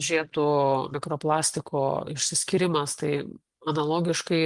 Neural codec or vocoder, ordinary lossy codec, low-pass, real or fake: none; Opus, 24 kbps; 10.8 kHz; real